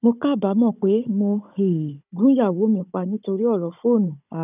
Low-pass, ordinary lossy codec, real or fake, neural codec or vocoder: 3.6 kHz; none; fake; codec, 16 kHz, 16 kbps, FunCodec, trained on Chinese and English, 50 frames a second